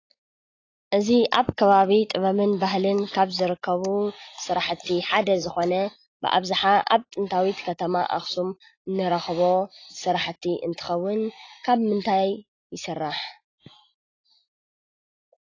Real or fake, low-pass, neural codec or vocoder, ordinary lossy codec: real; 7.2 kHz; none; AAC, 32 kbps